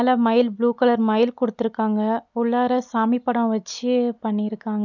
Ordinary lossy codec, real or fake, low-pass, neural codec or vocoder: none; real; 7.2 kHz; none